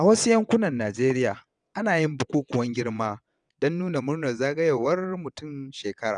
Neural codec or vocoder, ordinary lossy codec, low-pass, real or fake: vocoder, 22.05 kHz, 80 mel bands, WaveNeXt; none; 9.9 kHz; fake